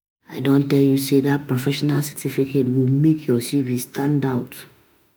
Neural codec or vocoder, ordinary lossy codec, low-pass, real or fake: autoencoder, 48 kHz, 32 numbers a frame, DAC-VAE, trained on Japanese speech; none; none; fake